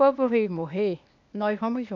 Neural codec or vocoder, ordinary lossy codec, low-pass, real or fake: codec, 16 kHz, 0.8 kbps, ZipCodec; none; 7.2 kHz; fake